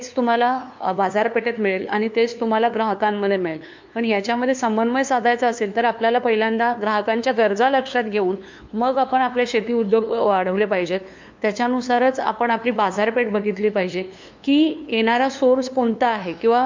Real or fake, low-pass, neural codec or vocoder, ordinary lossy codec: fake; 7.2 kHz; codec, 16 kHz, 2 kbps, FunCodec, trained on LibriTTS, 25 frames a second; MP3, 48 kbps